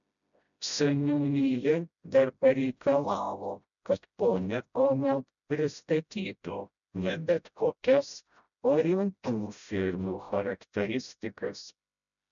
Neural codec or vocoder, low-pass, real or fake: codec, 16 kHz, 0.5 kbps, FreqCodec, smaller model; 7.2 kHz; fake